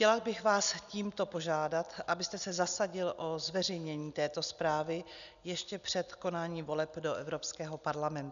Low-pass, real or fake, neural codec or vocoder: 7.2 kHz; real; none